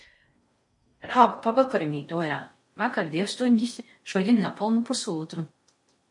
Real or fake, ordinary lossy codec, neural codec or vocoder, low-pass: fake; MP3, 48 kbps; codec, 16 kHz in and 24 kHz out, 0.6 kbps, FocalCodec, streaming, 4096 codes; 10.8 kHz